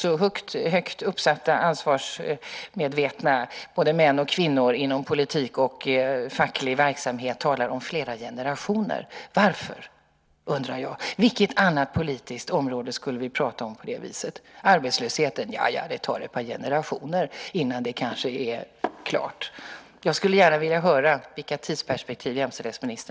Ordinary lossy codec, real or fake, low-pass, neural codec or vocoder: none; real; none; none